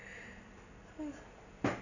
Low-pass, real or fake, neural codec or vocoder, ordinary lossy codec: 7.2 kHz; real; none; none